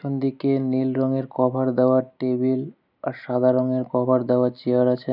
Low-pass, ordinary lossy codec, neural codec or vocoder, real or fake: 5.4 kHz; none; none; real